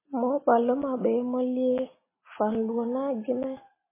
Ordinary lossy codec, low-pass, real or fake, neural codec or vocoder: MP3, 16 kbps; 3.6 kHz; real; none